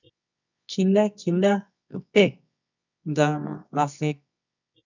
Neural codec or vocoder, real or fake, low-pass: codec, 24 kHz, 0.9 kbps, WavTokenizer, medium music audio release; fake; 7.2 kHz